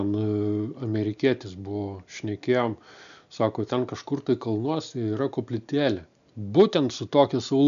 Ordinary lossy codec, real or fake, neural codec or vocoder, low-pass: MP3, 96 kbps; real; none; 7.2 kHz